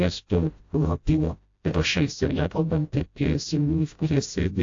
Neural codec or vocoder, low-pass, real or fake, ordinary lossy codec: codec, 16 kHz, 0.5 kbps, FreqCodec, smaller model; 7.2 kHz; fake; AAC, 48 kbps